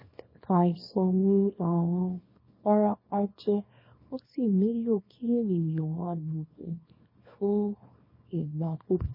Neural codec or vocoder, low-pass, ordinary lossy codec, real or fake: codec, 24 kHz, 0.9 kbps, WavTokenizer, small release; 5.4 kHz; MP3, 24 kbps; fake